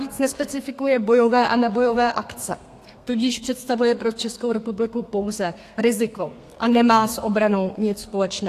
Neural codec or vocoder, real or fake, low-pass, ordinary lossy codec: codec, 32 kHz, 1.9 kbps, SNAC; fake; 14.4 kHz; AAC, 64 kbps